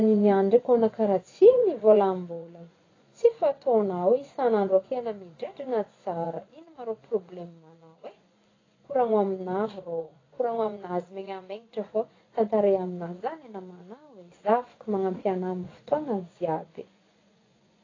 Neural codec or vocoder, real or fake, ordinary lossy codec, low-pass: vocoder, 24 kHz, 100 mel bands, Vocos; fake; AAC, 32 kbps; 7.2 kHz